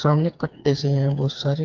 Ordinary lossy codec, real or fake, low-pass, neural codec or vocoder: Opus, 24 kbps; fake; 7.2 kHz; codec, 16 kHz, 4 kbps, FreqCodec, smaller model